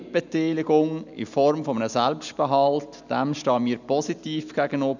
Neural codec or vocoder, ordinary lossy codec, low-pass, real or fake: none; none; 7.2 kHz; real